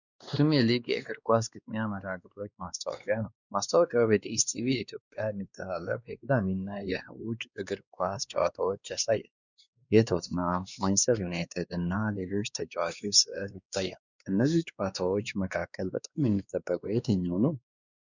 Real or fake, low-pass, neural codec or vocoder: fake; 7.2 kHz; codec, 16 kHz, 2 kbps, X-Codec, WavLM features, trained on Multilingual LibriSpeech